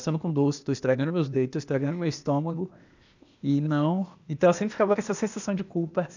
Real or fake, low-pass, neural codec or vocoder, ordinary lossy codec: fake; 7.2 kHz; codec, 16 kHz, 0.8 kbps, ZipCodec; none